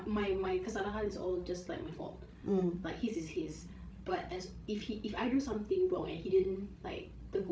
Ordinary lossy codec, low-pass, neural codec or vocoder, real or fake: none; none; codec, 16 kHz, 16 kbps, FreqCodec, larger model; fake